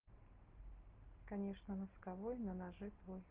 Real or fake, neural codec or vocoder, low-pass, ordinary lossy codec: real; none; 3.6 kHz; Opus, 16 kbps